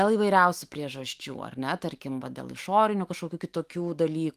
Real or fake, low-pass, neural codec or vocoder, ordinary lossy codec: fake; 14.4 kHz; autoencoder, 48 kHz, 128 numbers a frame, DAC-VAE, trained on Japanese speech; Opus, 24 kbps